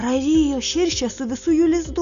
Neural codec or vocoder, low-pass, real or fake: none; 7.2 kHz; real